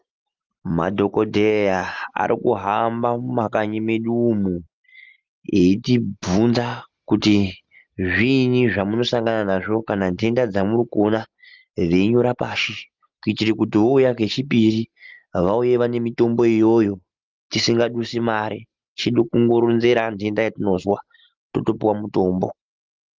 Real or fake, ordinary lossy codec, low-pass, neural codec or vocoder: real; Opus, 32 kbps; 7.2 kHz; none